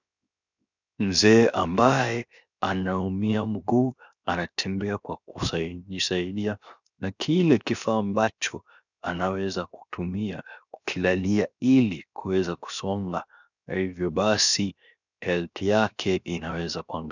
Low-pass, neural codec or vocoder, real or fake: 7.2 kHz; codec, 16 kHz, 0.7 kbps, FocalCodec; fake